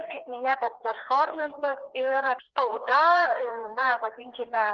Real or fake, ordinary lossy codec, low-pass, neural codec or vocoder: fake; Opus, 16 kbps; 7.2 kHz; codec, 16 kHz, 2 kbps, FreqCodec, larger model